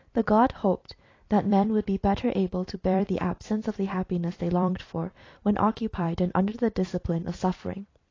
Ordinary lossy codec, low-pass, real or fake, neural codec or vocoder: AAC, 32 kbps; 7.2 kHz; fake; vocoder, 44.1 kHz, 128 mel bands every 256 samples, BigVGAN v2